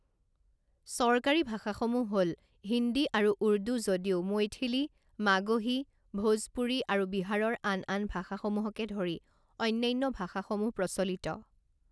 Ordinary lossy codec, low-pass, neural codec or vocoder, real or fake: none; none; none; real